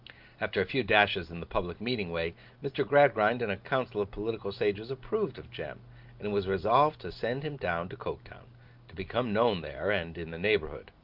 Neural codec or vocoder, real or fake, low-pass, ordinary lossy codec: none; real; 5.4 kHz; Opus, 32 kbps